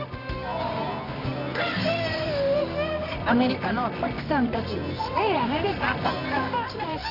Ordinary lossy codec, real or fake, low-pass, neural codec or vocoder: none; fake; 5.4 kHz; codec, 24 kHz, 0.9 kbps, WavTokenizer, medium music audio release